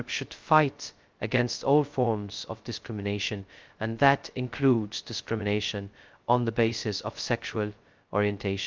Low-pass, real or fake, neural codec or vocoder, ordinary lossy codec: 7.2 kHz; fake; codec, 16 kHz, 0.2 kbps, FocalCodec; Opus, 32 kbps